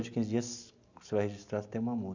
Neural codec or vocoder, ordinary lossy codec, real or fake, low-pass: none; Opus, 64 kbps; real; 7.2 kHz